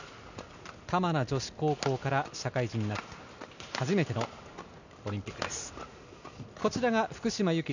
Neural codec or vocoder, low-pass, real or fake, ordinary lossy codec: none; 7.2 kHz; real; none